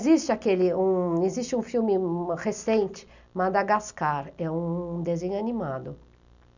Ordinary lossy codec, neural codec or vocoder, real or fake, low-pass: none; none; real; 7.2 kHz